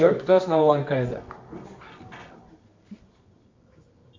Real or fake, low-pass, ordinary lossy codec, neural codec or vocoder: fake; 7.2 kHz; MP3, 48 kbps; codec, 24 kHz, 0.9 kbps, WavTokenizer, medium music audio release